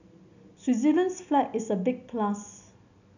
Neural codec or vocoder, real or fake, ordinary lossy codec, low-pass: none; real; none; 7.2 kHz